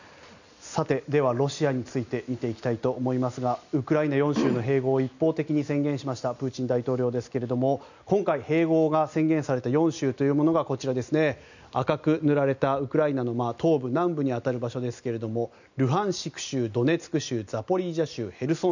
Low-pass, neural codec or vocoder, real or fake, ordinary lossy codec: 7.2 kHz; none; real; none